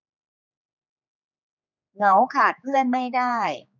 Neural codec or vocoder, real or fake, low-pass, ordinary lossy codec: codec, 16 kHz, 4 kbps, X-Codec, HuBERT features, trained on general audio; fake; 7.2 kHz; none